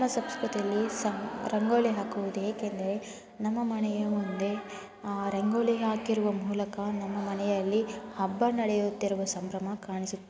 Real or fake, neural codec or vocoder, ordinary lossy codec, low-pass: real; none; none; none